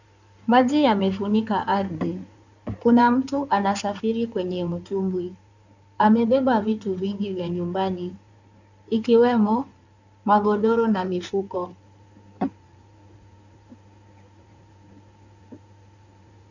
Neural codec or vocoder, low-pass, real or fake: codec, 16 kHz in and 24 kHz out, 2.2 kbps, FireRedTTS-2 codec; 7.2 kHz; fake